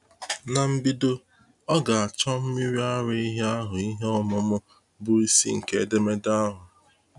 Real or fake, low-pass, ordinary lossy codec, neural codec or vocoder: real; 10.8 kHz; none; none